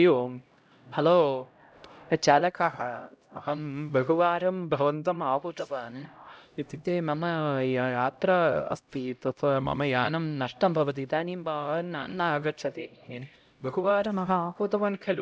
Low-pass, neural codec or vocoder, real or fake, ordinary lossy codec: none; codec, 16 kHz, 0.5 kbps, X-Codec, HuBERT features, trained on LibriSpeech; fake; none